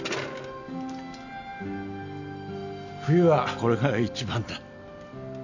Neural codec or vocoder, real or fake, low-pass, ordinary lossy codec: none; real; 7.2 kHz; none